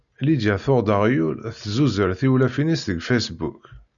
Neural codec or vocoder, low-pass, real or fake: none; 7.2 kHz; real